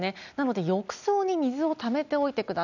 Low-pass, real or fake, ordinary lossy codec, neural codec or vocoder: 7.2 kHz; real; none; none